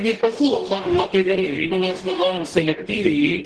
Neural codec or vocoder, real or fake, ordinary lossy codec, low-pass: codec, 44.1 kHz, 0.9 kbps, DAC; fake; Opus, 16 kbps; 10.8 kHz